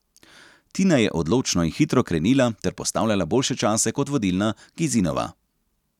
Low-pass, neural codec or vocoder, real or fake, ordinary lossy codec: 19.8 kHz; none; real; none